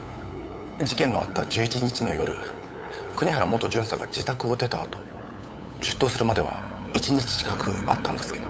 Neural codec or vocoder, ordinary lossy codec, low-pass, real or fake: codec, 16 kHz, 8 kbps, FunCodec, trained on LibriTTS, 25 frames a second; none; none; fake